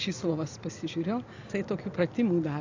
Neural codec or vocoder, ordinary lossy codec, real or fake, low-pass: none; AAC, 48 kbps; real; 7.2 kHz